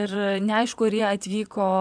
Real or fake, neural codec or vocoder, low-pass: fake; vocoder, 22.05 kHz, 80 mel bands, WaveNeXt; 9.9 kHz